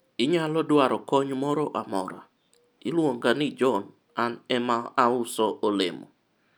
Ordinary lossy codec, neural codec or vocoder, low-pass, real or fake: none; none; none; real